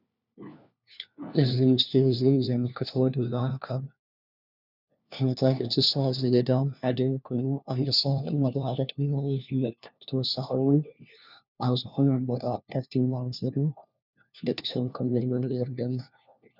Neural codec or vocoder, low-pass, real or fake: codec, 16 kHz, 1 kbps, FunCodec, trained on LibriTTS, 50 frames a second; 5.4 kHz; fake